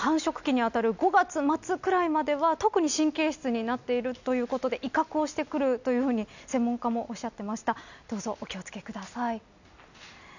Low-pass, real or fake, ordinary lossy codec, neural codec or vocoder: 7.2 kHz; real; none; none